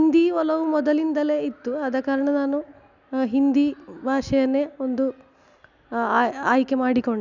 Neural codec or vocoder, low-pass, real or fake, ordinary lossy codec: none; 7.2 kHz; real; none